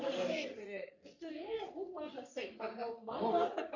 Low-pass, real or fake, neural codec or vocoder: 7.2 kHz; fake; codec, 44.1 kHz, 3.4 kbps, Pupu-Codec